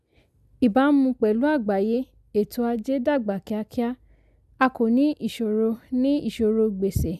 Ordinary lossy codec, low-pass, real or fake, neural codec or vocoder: none; 14.4 kHz; real; none